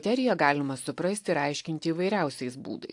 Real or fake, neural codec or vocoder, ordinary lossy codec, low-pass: real; none; AAC, 64 kbps; 10.8 kHz